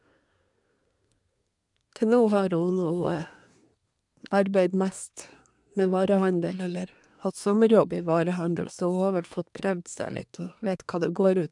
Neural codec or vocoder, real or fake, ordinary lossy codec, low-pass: codec, 24 kHz, 1 kbps, SNAC; fake; none; 10.8 kHz